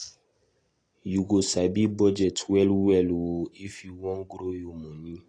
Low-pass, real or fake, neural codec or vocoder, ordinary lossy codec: 9.9 kHz; real; none; AAC, 32 kbps